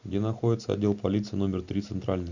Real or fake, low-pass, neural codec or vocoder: real; 7.2 kHz; none